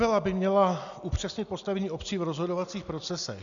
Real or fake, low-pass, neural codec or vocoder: real; 7.2 kHz; none